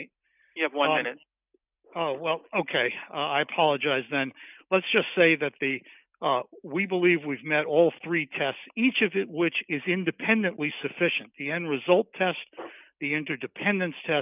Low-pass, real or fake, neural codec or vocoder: 3.6 kHz; real; none